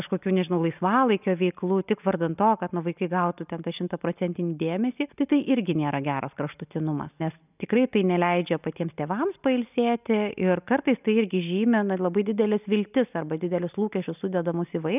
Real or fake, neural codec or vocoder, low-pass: real; none; 3.6 kHz